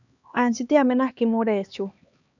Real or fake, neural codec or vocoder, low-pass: fake; codec, 16 kHz, 2 kbps, X-Codec, HuBERT features, trained on LibriSpeech; 7.2 kHz